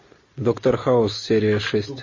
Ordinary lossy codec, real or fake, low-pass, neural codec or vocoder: MP3, 32 kbps; real; 7.2 kHz; none